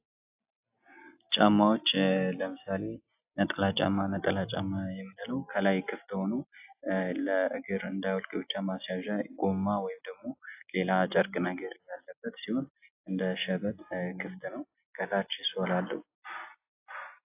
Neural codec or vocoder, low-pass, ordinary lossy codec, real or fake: none; 3.6 kHz; AAC, 32 kbps; real